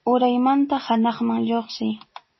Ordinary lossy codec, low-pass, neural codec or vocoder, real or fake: MP3, 24 kbps; 7.2 kHz; none; real